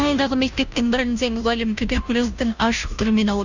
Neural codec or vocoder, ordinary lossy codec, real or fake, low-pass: codec, 16 kHz, 0.5 kbps, FunCodec, trained on Chinese and English, 25 frames a second; none; fake; 7.2 kHz